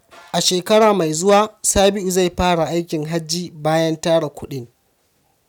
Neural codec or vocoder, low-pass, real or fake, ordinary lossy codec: none; 19.8 kHz; real; none